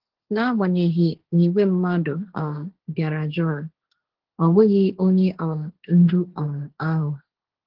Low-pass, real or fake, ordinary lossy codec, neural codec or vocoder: 5.4 kHz; fake; Opus, 16 kbps; codec, 16 kHz, 1.1 kbps, Voila-Tokenizer